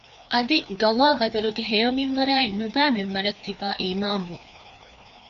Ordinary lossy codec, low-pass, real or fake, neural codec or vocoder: AAC, 96 kbps; 7.2 kHz; fake; codec, 16 kHz, 2 kbps, FreqCodec, larger model